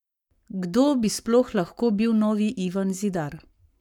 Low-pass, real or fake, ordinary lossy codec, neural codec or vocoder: 19.8 kHz; fake; none; codec, 44.1 kHz, 7.8 kbps, Pupu-Codec